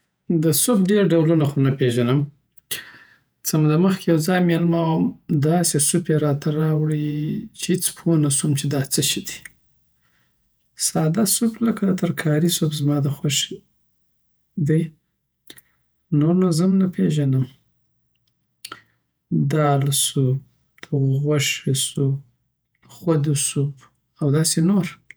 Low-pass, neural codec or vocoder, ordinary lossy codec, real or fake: none; vocoder, 48 kHz, 128 mel bands, Vocos; none; fake